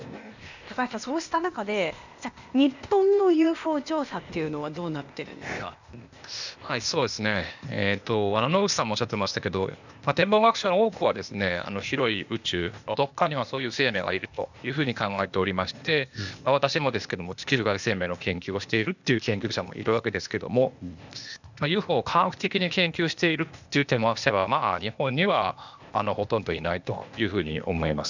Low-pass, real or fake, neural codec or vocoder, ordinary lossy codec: 7.2 kHz; fake; codec, 16 kHz, 0.8 kbps, ZipCodec; none